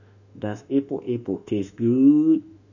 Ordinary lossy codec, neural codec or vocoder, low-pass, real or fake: none; autoencoder, 48 kHz, 32 numbers a frame, DAC-VAE, trained on Japanese speech; 7.2 kHz; fake